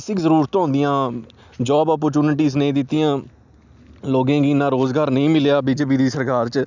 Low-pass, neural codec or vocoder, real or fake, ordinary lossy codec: 7.2 kHz; none; real; none